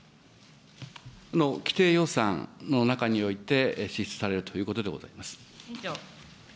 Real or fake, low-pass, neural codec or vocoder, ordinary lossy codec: real; none; none; none